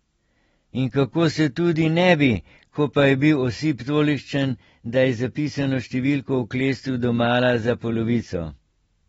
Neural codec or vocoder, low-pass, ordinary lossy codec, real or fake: none; 10.8 kHz; AAC, 24 kbps; real